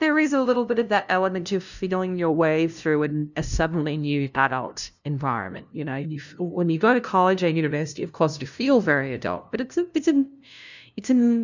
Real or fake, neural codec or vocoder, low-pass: fake; codec, 16 kHz, 0.5 kbps, FunCodec, trained on LibriTTS, 25 frames a second; 7.2 kHz